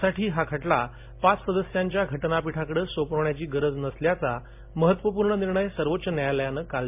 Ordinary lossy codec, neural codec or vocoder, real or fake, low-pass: MP3, 32 kbps; none; real; 3.6 kHz